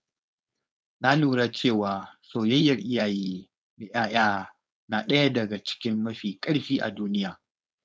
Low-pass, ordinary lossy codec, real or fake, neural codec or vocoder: none; none; fake; codec, 16 kHz, 4.8 kbps, FACodec